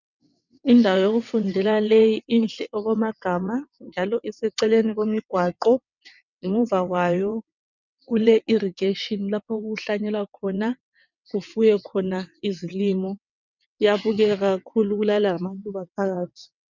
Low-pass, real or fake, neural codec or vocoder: 7.2 kHz; fake; vocoder, 22.05 kHz, 80 mel bands, WaveNeXt